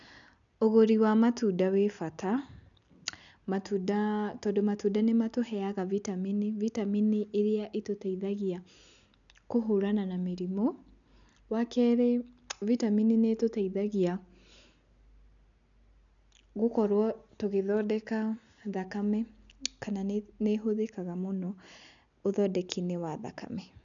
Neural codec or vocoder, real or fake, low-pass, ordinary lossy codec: none; real; 7.2 kHz; none